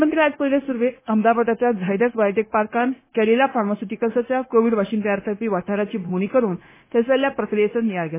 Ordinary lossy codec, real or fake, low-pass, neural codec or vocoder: MP3, 16 kbps; fake; 3.6 kHz; codec, 16 kHz, 0.9 kbps, LongCat-Audio-Codec